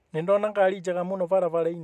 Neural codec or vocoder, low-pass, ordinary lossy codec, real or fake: none; 14.4 kHz; none; real